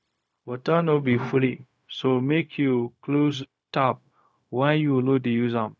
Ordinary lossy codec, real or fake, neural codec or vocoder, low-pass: none; fake; codec, 16 kHz, 0.4 kbps, LongCat-Audio-Codec; none